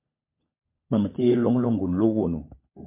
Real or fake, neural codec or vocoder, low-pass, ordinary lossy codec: fake; codec, 16 kHz, 16 kbps, FunCodec, trained on LibriTTS, 50 frames a second; 3.6 kHz; MP3, 24 kbps